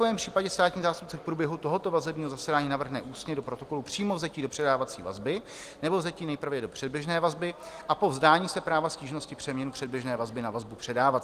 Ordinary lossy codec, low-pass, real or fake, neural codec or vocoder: Opus, 24 kbps; 14.4 kHz; real; none